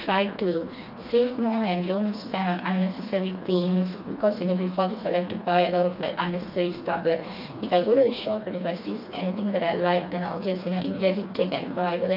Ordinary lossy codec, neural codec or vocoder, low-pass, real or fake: AAC, 48 kbps; codec, 16 kHz, 2 kbps, FreqCodec, smaller model; 5.4 kHz; fake